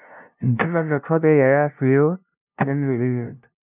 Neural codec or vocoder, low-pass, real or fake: codec, 16 kHz, 0.5 kbps, FunCodec, trained on LibriTTS, 25 frames a second; 3.6 kHz; fake